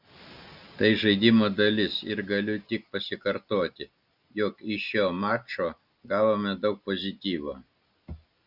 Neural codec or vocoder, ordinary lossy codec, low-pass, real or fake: none; Opus, 64 kbps; 5.4 kHz; real